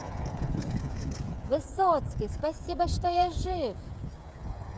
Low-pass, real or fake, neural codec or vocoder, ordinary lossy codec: none; fake; codec, 16 kHz, 8 kbps, FreqCodec, smaller model; none